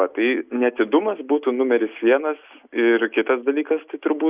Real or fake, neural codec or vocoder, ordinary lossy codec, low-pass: real; none; Opus, 64 kbps; 3.6 kHz